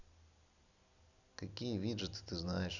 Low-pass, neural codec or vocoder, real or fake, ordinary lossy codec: 7.2 kHz; none; real; none